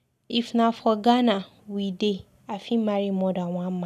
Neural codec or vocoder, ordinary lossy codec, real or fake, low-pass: none; none; real; 14.4 kHz